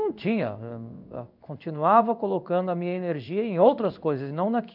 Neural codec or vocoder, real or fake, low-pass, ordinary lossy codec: codec, 16 kHz in and 24 kHz out, 1 kbps, XY-Tokenizer; fake; 5.4 kHz; none